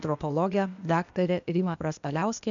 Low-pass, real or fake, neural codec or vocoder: 7.2 kHz; fake; codec, 16 kHz, 0.8 kbps, ZipCodec